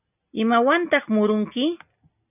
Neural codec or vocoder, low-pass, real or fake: none; 3.6 kHz; real